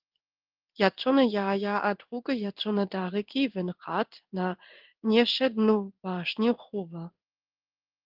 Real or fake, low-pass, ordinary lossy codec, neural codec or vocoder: fake; 5.4 kHz; Opus, 24 kbps; codec, 16 kHz in and 24 kHz out, 1 kbps, XY-Tokenizer